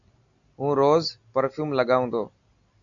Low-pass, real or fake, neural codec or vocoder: 7.2 kHz; real; none